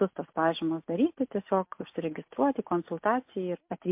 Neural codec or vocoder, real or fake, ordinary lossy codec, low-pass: none; real; MP3, 32 kbps; 3.6 kHz